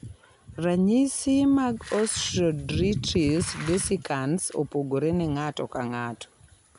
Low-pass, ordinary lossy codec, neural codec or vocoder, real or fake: 10.8 kHz; none; none; real